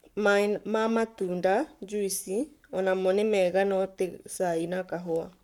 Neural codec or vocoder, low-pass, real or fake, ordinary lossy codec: codec, 44.1 kHz, 7.8 kbps, Pupu-Codec; 19.8 kHz; fake; none